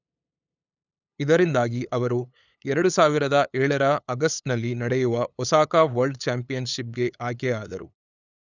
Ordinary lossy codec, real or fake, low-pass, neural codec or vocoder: none; fake; 7.2 kHz; codec, 16 kHz, 8 kbps, FunCodec, trained on LibriTTS, 25 frames a second